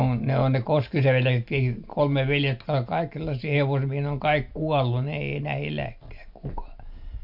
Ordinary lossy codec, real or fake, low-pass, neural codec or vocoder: MP3, 48 kbps; real; 5.4 kHz; none